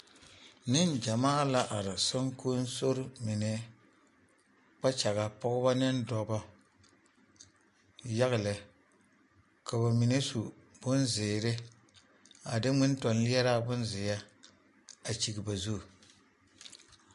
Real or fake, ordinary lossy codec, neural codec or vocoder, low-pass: real; MP3, 48 kbps; none; 14.4 kHz